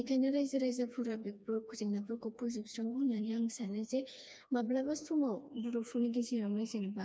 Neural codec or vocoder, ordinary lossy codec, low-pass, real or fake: codec, 16 kHz, 2 kbps, FreqCodec, smaller model; none; none; fake